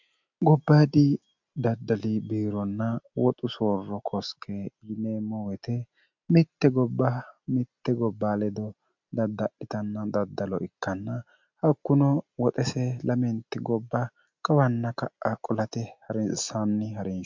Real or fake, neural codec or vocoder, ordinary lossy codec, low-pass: real; none; MP3, 64 kbps; 7.2 kHz